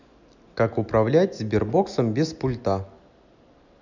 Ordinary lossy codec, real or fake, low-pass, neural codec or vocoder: none; real; 7.2 kHz; none